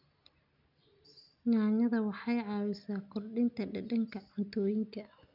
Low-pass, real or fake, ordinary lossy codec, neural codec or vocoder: 5.4 kHz; real; none; none